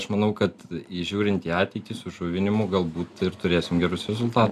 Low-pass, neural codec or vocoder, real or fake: 14.4 kHz; none; real